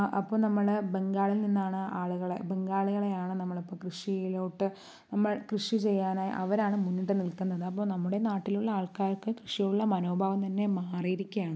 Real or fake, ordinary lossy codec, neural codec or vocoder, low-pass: real; none; none; none